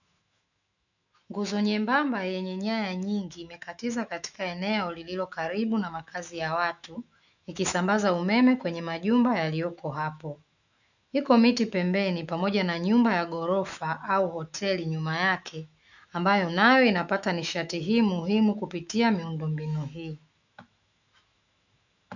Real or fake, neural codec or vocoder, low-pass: fake; autoencoder, 48 kHz, 128 numbers a frame, DAC-VAE, trained on Japanese speech; 7.2 kHz